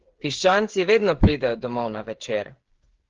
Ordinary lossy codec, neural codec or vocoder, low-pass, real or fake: Opus, 16 kbps; codec, 16 kHz, 8 kbps, FreqCodec, smaller model; 7.2 kHz; fake